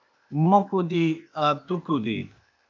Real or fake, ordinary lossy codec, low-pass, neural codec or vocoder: fake; MP3, 64 kbps; 7.2 kHz; codec, 16 kHz, 0.8 kbps, ZipCodec